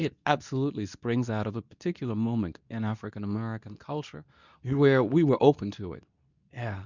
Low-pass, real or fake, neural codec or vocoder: 7.2 kHz; fake; codec, 24 kHz, 0.9 kbps, WavTokenizer, medium speech release version 2